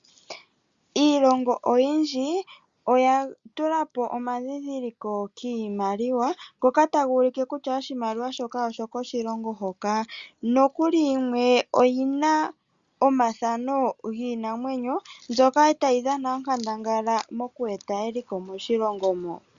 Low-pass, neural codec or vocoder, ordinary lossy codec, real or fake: 7.2 kHz; none; Opus, 64 kbps; real